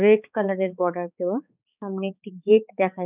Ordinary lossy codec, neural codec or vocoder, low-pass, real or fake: none; codec, 16 kHz, 4 kbps, X-Codec, HuBERT features, trained on balanced general audio; 3.6 kHz; fake